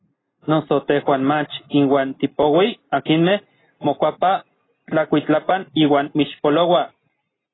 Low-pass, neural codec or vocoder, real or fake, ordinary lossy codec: 7.2 kHz; none; real; AAC, 16 kbps